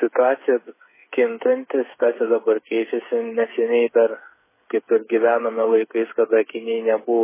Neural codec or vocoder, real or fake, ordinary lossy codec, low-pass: codec, 16 kHz, 8 kbps, FreqCodec, smaller model; fake; MP3, 16 kbps; 3.6 kHz